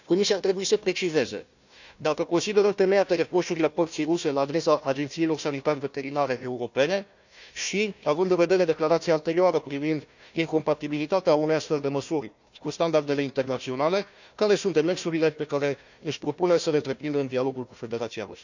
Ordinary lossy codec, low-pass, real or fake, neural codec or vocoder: none; 7.2 kHz; fake; codec, 16 kHz, 1 kbps, FunCodec, trained on Chinese and English, 50 frames a second